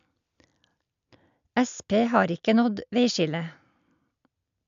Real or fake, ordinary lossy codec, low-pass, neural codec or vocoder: real; AAC, 96 kbps; 7.2 kHz; none